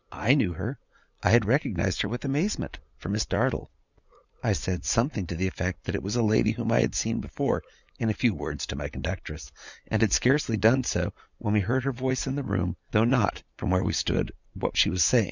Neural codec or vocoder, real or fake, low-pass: vocoder, 44.1 kHz, 80 mel bands, Vocos; fake; 7.2 kHz